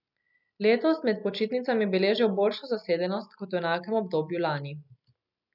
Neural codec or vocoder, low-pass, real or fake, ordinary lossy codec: none; 5.4 kHz; real; none